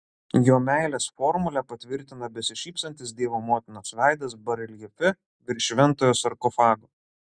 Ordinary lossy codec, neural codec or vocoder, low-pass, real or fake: Opus, 64 kbps; none; 9.9 kHz; real